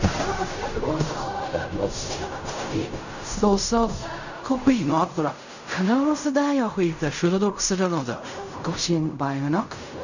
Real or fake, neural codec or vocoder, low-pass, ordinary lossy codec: fake; codec, 16 kHz in and 24 kHz out, 0.4 kbps, LongCat-Audio-Codec, fine tuned four codebook decoder; 7.2 kHz; none